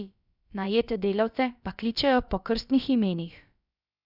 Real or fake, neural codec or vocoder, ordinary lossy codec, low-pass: fake; codec, 16 kHz, about 1 kbps, DyCAST, with the encoder's durations; none; 5.4 kHz